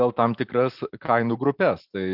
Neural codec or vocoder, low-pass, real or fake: none; 5.4 kHz; real